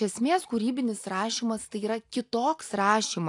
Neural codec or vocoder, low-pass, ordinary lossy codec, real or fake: none; 10.8 kHz; AAC, 64 kbps; real